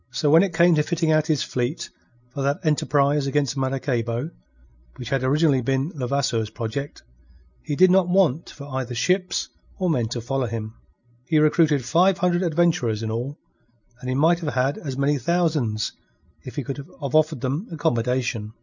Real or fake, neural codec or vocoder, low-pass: real; none; 7.2 kHz